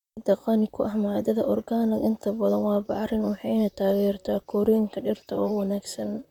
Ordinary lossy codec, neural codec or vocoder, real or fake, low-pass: none; vocoder, 44.1 kHz, 128 mel bands, Pupu-Vocoder; fake; 19.8 kHz